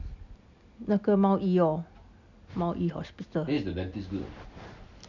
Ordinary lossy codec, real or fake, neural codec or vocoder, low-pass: none; real; none; 7.2 kHz